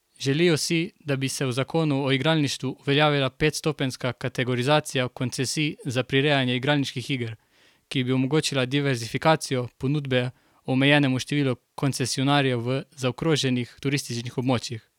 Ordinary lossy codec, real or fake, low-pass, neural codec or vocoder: none; real; 19.8 kHz; none